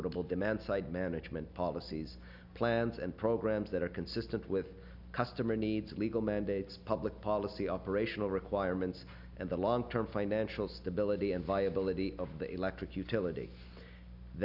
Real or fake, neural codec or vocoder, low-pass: real; none; 5.4 kHz